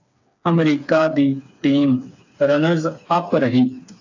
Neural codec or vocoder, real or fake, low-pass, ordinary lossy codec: codec, 16 kHz, 4 kbps, FreqCodec, smaller model; fake; 7.2 kHz; AAC, 48 kbps